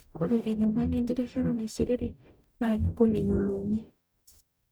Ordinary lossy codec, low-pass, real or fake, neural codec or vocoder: none; none; fake; codec, 44.1 kHz, 0.9 kbps, DAC